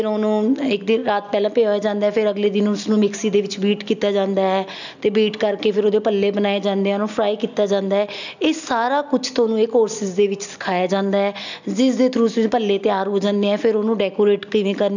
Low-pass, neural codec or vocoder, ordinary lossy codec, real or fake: 7.2 kHz; none; none; real